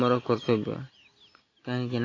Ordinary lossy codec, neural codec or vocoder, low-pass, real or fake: AAC, 32 kbps; none; 7.2 kHz; real